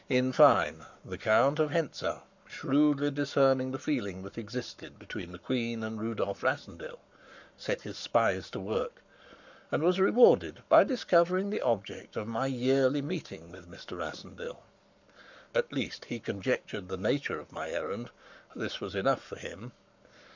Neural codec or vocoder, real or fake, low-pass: codec, 44.1 kHz, 7.8 kbps, Pupu-Codec; fake; 7.2 kHz